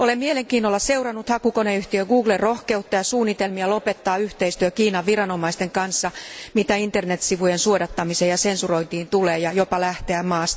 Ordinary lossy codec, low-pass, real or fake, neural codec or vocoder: none; none; real; none